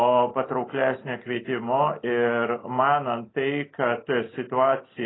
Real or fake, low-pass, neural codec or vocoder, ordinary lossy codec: real; 7.2 kHz; none; AAC, 16 kbps